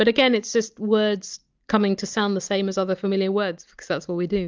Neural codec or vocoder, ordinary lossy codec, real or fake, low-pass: none; Opus, 24 kbps; real; 7.2 kHz